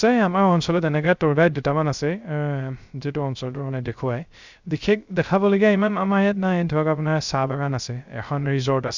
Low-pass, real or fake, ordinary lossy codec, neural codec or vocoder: 7.2 kHz; fake; Opus, 64 kbps; codec, 16 kHz, 0.3 kbps, FocalCodec